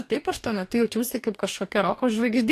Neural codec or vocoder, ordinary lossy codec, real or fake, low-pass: codec, 44.1 kHz, 2.6 kbps, DAC; AAC, 64 kbps; fake; 14.4 kHz